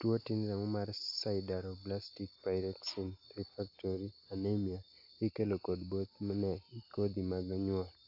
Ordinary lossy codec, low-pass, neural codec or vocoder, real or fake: AAC, 48 kbps; 5.4 kHz; none; real